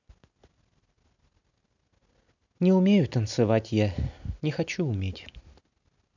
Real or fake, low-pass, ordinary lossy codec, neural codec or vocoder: real; 7.2 kHz; none; none